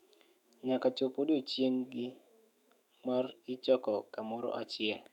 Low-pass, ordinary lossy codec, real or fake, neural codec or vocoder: 19.8 kHz; none; fake; autoencoder, 48 kHz, 128 numbers a frame, DAC-VAE, trained on Japanese speech